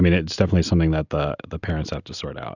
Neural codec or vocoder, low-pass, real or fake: none; 7.2 kHz; real